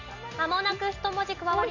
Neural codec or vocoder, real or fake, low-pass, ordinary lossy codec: none; real; 7.2 kHz; none